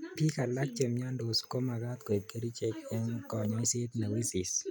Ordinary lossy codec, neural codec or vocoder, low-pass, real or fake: none; none; none; real